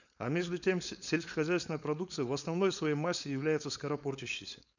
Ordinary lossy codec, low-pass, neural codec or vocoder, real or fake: none; 7.2 kHz; codec, 16 kHz, 4.8 kbps, FACodec; fake